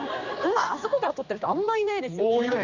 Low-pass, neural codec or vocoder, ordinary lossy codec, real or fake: 7.2 kHz; codec, 16 kHz, 2 kbps, X-Codec, HuBERT features, trained on general audio; Opus, 64 kbps; fake